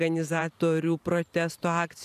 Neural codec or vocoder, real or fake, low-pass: vocoder, 44.1 kHz, 128 mel bands every 512 samples, BigVGAN v2; fake; 14.4 kHz